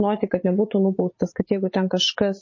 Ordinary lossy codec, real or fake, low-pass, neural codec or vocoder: MP3, 32 kbps; fake; 7.2 kHz; vocoder, 44.1 kHz, 80 mel bands, Vocos